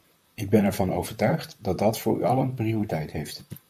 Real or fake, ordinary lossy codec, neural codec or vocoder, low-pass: fake; MP3, 96 kbps; vocoder, 44.1 kHz, 128 mel bands, Pupu-Vocoder; 14.4 kHz